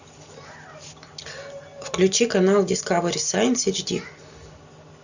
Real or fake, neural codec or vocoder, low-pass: real; none; 7.2 kHz